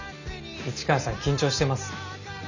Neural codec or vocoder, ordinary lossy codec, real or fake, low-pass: none; none; real; 7.2 kHz